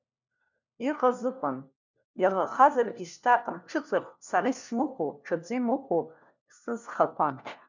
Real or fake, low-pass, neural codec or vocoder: fake; 7.2 kHz; codec, 16 kHz, 1 kbps, FunCodec, trained on LibriTTS, 50 frames a second